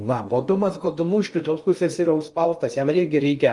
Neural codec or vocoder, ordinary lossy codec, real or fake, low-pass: codec, 16 kHz in and 24 kHz out, 0.6 kbps, FocalCodec, streaming, 2048 codes; Opus, 32 kbps; fake; 10.8 kHz